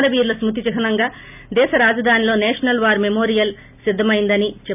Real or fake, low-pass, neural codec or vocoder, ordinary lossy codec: real; 3.6 kHz; none; none